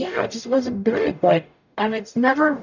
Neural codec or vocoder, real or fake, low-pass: codec, 44.1 kHz, 0.9 kbps, DAC; fake; 7.2 kHz